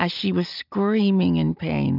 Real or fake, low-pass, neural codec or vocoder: real; 5.4 kHz; none